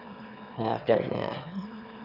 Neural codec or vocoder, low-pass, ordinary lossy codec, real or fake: autoencoder, 22.05 kHz, a latent of 192 numbers a frame, VITS, trained on one speaker; 5.4 kHz; none; fake